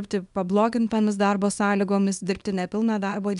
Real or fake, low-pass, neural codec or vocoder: fake; 10.8 kHz; codec, 24 kHz, 0.9 kbps, WavTokenizer, small release